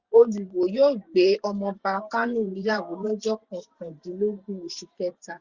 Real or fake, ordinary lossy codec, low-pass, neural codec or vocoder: fake; Opus, 64 kbps; 7.2 kHz; vocoder, 44.1 kHz, 128 mel bands every 512 samples, BigVGAN v2